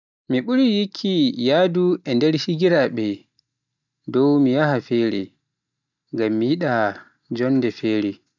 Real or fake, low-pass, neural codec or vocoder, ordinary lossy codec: real; 7.2 kHz; none; none